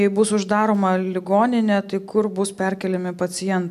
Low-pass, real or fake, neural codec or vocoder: 14.4 kHz; real; none